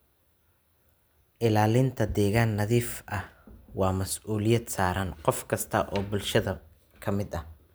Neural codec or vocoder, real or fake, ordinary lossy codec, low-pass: none; real; none; none